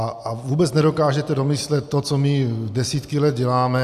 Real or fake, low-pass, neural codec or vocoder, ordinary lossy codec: real; 14.4 kHz; none; AAC, 96 kbps